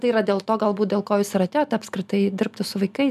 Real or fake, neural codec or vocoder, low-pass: real; none; 14.4 kHz